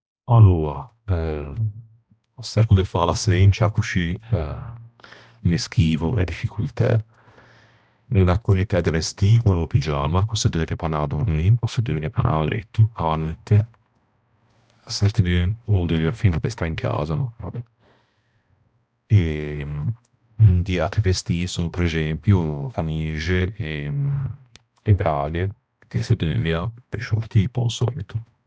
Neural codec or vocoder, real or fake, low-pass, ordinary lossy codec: codec, 16 kHz, 1 kbps, X-Codec, HuBERT features, trained on balanced general audio; fake; none; none